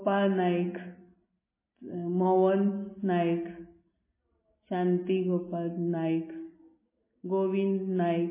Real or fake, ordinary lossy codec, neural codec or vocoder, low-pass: real; MP3, 16 kbps; none; 3.6 kHz